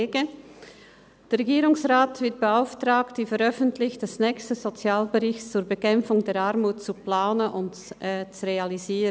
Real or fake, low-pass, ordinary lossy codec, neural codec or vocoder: real; none; none; none